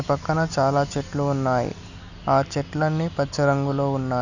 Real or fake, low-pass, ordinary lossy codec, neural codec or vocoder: real; 7.2 kHz; none; none